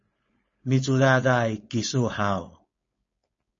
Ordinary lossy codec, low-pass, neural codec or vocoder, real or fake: MP3, 32 kbps; 7.2 kHz; codec, 16 kHz, 4.8 kbps, FACodec; fake